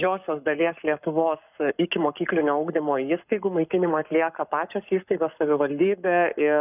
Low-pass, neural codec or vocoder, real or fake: 3.6 kHz; codec, 44.1 kHz, 7.8 kbps, Pupu-Codec; fake